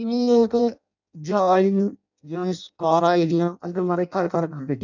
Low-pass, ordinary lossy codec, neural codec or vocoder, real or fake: 7.2 kHz; none; codec, 16 kHz in and 24 kHz out, 0.6 kbps, FireRedTTS-2 codec; fake